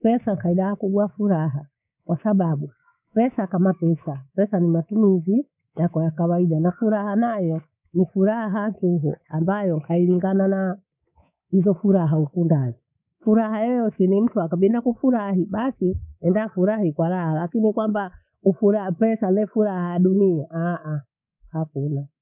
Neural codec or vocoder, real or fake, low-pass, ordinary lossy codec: codec, 24 kHz, 3.1 kbps, DualCodec; fake; 3.6 kHz; none